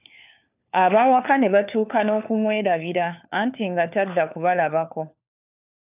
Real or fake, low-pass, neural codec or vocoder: fake; 3.6 kHz; codec, 16 kHz, 4 kbps, FunCodec, trained on LibriTTS, 50 frames a second